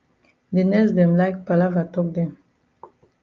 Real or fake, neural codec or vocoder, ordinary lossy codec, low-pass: real; none; Opus, 24 kbps; 7.2 kHz